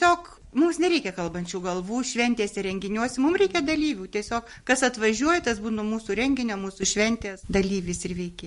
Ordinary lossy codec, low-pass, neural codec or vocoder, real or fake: MP3, 48 kbps; 14.4 kHz; none; real